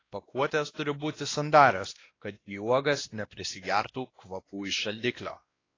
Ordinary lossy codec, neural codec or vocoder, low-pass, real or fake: AAC, 32 kbps; codec, 16 kHz, 1 kbps, X-Codec, WavLM features, trained on Multilingual LibriSpeech; 7.2 kHz; fake